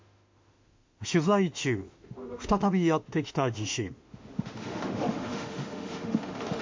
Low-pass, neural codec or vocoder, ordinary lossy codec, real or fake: 7.2 kHz; autoencoder, 48 kHz, 32 numbers a frame, DAC-VAE, trained on Japanese speech; MP3, 48 kbps; fake